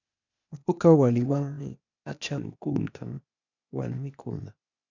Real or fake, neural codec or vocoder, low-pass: fake; codec, 16 kHz, 0.8 kbps, ZipCodec; 7.2 kHz